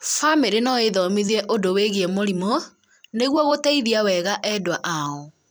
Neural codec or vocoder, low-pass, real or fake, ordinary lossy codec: vocoder, 44.1 kHz, 128 mel bands every 256 samples, BigVGAN v2; none; fake; none